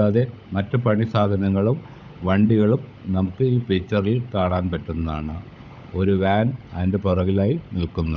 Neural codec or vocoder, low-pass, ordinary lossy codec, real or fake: codec, 16 kHz, 16 kbps, FunCodec, trained on LibriTTS, 50 frames a second; 7.2 kHz; none; fake